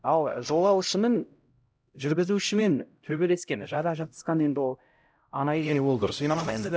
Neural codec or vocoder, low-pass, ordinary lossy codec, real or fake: codec, 16 kHz, 0.5 kbps, X-Codec, HuBERT features, trained on LibriSpeech; none; none; fake